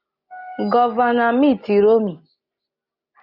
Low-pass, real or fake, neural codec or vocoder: 5.4 kHz; real; none